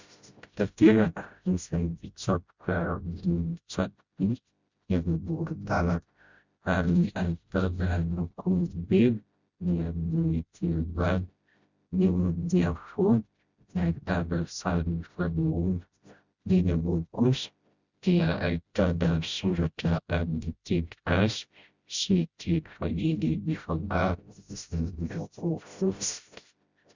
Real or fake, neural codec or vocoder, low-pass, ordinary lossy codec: fake; codec, 16 kHz, 0.5 kbps, FreqCodec, smaller model; 7.2 kHz; Opus, 64 kbps